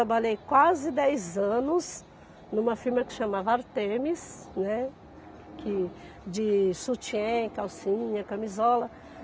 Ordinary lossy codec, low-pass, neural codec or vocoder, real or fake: none; none; none; real